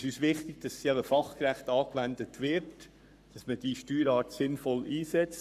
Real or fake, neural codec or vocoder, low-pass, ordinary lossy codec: fake; codec, 44.1 kHz, 7.8 kbps, Pupu-Codec; 14.4 kHz; none